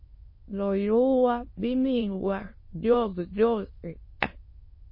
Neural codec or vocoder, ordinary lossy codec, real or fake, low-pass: autoencoder, 22.05 kHz, a latent of 192 numbers a frame, VITS, trained on many speakers; MP3, 24 kbps; fake; 5.4 kHz